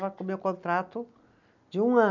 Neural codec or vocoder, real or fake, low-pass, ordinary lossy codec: none; real; 7.2 kHz; none